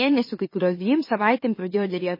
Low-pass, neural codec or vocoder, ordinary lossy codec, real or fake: 5.4 kHz; autoencoder, 44.1 kHz, a latent of 192 numbers a frame, MeloTTS; MP3, 24 kbps; fake